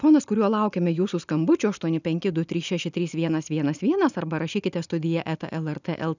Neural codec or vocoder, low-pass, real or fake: none; 7.2 kHz; real